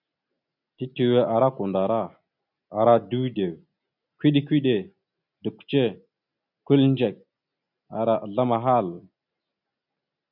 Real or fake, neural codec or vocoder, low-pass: real; none; 5.4 kHz